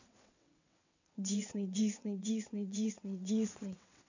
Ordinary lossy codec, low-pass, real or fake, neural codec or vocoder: none; 7.2 kHz; fake; vocoder, 22.05 kHz, 80 mel bands, WaveNeXt